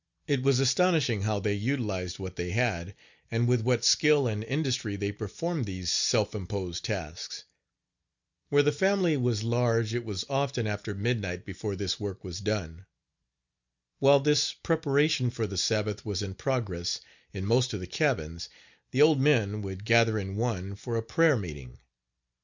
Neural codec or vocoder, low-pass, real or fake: none; 7.2 kHz; real